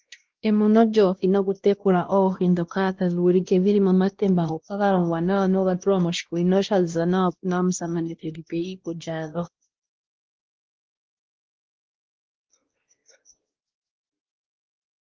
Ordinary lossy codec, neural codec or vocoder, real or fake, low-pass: Opus, 16 kbps; codec, 16 kHz, 1 kbps, X-Codec, WavLM features, trained on Multilingual LibriSpeech; fake; 7.2 kHz